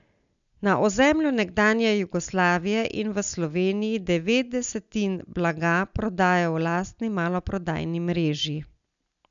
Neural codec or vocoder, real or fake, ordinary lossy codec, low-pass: none; real; none; 7.2 kHz